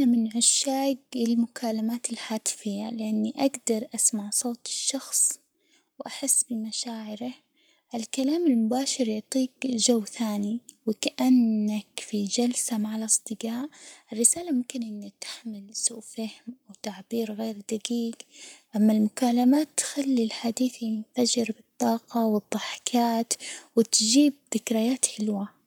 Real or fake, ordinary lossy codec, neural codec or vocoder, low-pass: fake; none; codec, 44.1 kHz, 7.8 kbps, Pupu-Codec; none